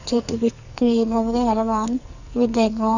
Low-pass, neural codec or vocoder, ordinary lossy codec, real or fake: 7.2 kHz; codec, 44.1 kHz, 2.6 kbps, SNAC; none; fake